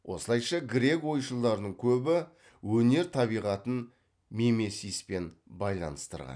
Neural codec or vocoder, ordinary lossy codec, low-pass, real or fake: none; none; 9.9 kHz; real